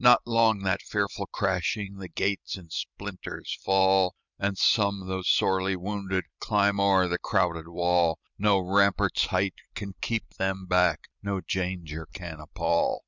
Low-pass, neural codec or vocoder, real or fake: 7.2 kHz; vocoder, 44.1 kHz, 128 mel bands every 256 samples, BigVGAN v2; fake